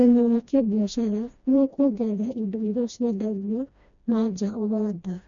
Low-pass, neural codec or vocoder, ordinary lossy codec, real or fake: 7.2 kHz; codec, 16 kHz, 1 kbps, FreqCodec, smaller model; MP3, 64 kbps; fake